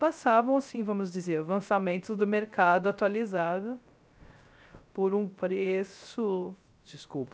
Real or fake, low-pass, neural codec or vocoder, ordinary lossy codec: fake; none; codec, 16 kHz, 0.3 kbps, FocalCodec; none